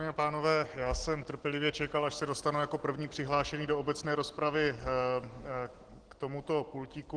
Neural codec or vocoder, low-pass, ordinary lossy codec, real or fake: none; 9.9 kHz; Opus, 16 kbps; real